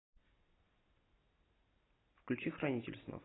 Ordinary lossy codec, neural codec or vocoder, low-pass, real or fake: AAC, 16 kbps; none; 7.2 kHz; real